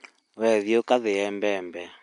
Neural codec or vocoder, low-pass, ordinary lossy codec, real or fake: none; 10.8 kHz; MP3, 96 kbps; real